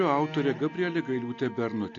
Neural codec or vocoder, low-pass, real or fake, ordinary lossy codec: none; 7.2 kHz; real; AAC, 48 kbps